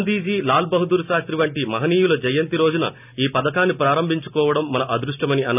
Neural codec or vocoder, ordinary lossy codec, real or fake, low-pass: none; none; real; 3.6 kHz